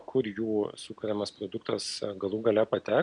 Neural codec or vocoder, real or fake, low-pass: none; real; 9.9 kHz